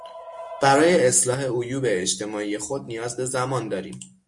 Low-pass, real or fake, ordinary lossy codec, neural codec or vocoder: 10.8 kHz; real; MP3, 48 kbps; none